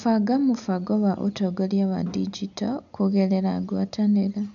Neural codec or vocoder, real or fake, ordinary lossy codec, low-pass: none; real; none; 7.2 kHz